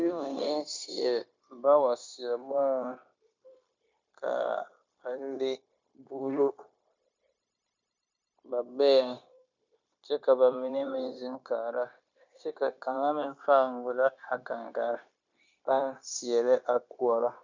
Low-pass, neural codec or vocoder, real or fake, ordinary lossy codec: 7.2 kHz; codec, 16 kHz, 0.9 kbps, LongCat-Audio-Codec; fake; MP3, 64 kbps